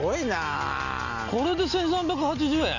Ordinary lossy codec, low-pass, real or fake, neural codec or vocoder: none; 7.2 kHz; real; none